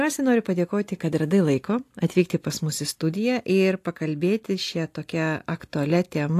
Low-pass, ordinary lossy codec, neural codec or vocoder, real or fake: 14.4 kHz; AAC, 64 kbps; none; real